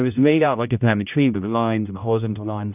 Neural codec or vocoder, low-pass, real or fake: codec, 16 kHz, 0.5 kbps, X-Codec, HuBERT features, trained on general audio; 3.6 kHz; fake